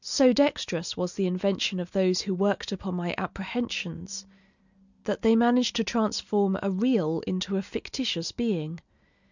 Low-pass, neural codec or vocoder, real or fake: 7.2 kHz; none; real